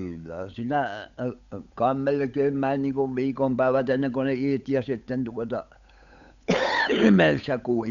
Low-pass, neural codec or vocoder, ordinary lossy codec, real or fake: 7.2 kHz; codec, 16 kHz, 8 kbps, FunCodec, trained on Chinese and English, 25 frames a second; none; fake